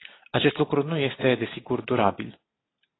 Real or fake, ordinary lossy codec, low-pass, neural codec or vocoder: real; AAC, 16 kbps; 7.2 kHz; none